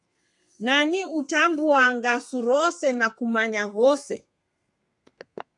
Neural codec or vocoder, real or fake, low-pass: codec, 32 kHz, 1.9 kbps, SNAC; fake; 10.8 kHz